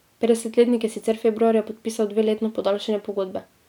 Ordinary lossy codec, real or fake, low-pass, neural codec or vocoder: none; real; 19.8 kHz; none